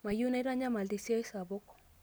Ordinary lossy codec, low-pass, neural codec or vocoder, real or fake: none; none; none; real